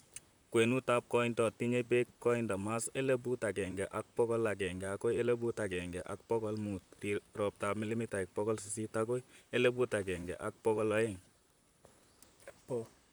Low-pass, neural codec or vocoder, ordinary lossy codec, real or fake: none; vocoder, 44.1 kHz, 128 mel bands, Pupu-Vocoder; none; fake